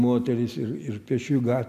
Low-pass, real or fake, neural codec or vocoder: 14.4 kHz; real; none